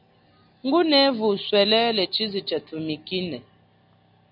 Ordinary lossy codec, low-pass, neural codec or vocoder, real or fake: AAC, 48 kbps; 5.4 kHz; none; real